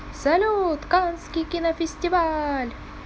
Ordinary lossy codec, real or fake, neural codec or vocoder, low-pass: none; real; none; none